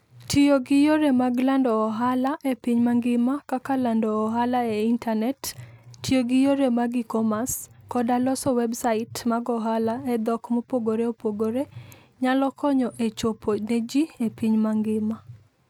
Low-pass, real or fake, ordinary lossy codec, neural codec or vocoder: 19.8 kHz; real; none; none